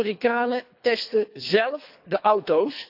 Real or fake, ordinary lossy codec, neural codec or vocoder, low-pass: fake; AAC, 48 kbps; codec, 24 kHz, 3 kbps, HILCodec; 5.4 kHz